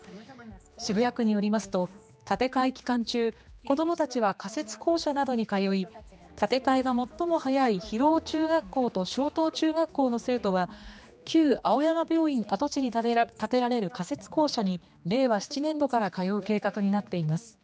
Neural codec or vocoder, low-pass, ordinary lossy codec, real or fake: codec, 16 kHz, 2 kbps, X-Codec, HuBERT features, trained on general audio; none; none; fake